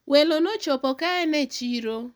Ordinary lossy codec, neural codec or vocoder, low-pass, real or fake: none; none; none; real